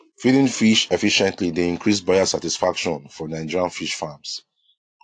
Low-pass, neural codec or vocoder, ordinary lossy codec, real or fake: 9.9 kHz; none; AAC, 48 kbps; real